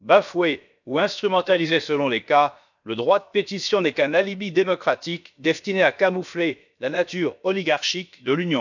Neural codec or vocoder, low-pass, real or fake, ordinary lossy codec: codec, 16 kHz, about 1 kbps, DyCAST, with the encoder's durations; 7.2 kHz; fake; none